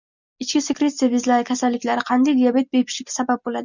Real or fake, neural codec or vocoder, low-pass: real; none; 7.2 kHz